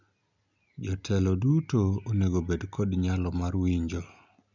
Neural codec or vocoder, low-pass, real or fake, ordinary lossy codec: none; 7.2 kHz; real; none